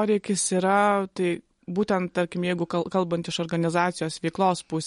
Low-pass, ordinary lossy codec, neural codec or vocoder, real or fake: 10.8 kHz; MP3, 48 kbps; none; real